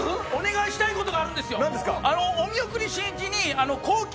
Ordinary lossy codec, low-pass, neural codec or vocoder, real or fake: none; none; none; real